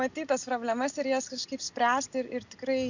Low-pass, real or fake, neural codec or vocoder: 7.2 kHz; real; none